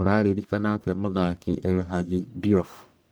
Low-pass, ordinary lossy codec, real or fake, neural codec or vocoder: none; none; fake; codec, 44.1 kHz, 1.7 kbps, Pupu-Codec